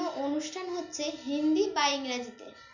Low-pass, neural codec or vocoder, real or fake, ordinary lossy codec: 7.2 kHz; none; real; none